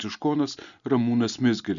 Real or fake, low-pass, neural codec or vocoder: real; 7.2 kHz; none